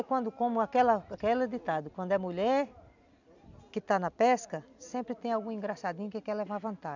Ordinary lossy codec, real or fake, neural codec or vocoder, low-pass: none; real; none; 7.2 kHz